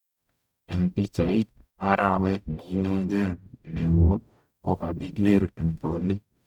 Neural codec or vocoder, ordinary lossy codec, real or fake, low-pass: codec, 44.1 kHz, 0.9 kbps, DAC; none; fake; 19.8 kHz